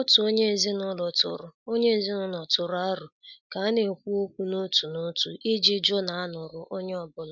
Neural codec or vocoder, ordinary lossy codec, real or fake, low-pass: none; none; real; 7.2 kHz